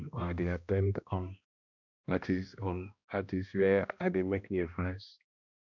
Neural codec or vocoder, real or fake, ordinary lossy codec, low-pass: codec, 16 kHz, 1 kbps, X-Codec, HuBERT features, trained on balanced general audio; fake; none; 7.2 kHz